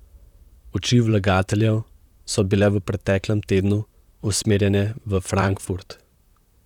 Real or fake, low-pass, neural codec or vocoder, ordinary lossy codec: fake; 19.8 kHz; vocoder, 44.1 kHz, 128 mel bands, Pupu-Vocoder; none